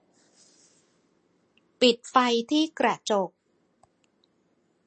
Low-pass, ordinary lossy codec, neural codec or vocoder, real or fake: 10.8 kHz; MP3, 32 kbps; none; real